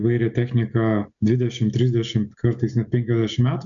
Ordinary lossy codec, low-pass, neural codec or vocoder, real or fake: MP3, 64 kbps; 7.2 kHz; none; real